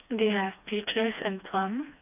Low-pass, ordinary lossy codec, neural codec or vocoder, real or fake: 3.6 kHz; none; codec, 16 kHz, 2 kbps, FreqCodec, smaller model; fake